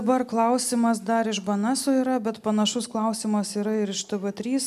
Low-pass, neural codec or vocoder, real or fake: 14.4 kHz; none; real